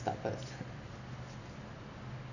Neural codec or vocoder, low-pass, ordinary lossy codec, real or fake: none; 7.2 kHz; none; real